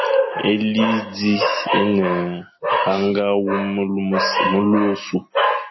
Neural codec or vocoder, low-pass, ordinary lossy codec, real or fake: none; 7.2 kHz; MP3, 24 kbps; real